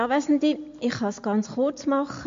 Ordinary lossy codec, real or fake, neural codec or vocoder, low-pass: MP3, 48 kbps; real; none; 7.2 kHz